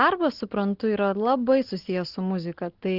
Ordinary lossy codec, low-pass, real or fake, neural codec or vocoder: Opus, 16 kbps; 5.4 kHz; real; none